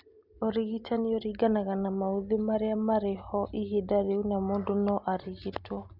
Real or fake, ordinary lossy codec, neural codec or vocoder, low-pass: real; Opus, 64 kbps; none; 5.4 kHz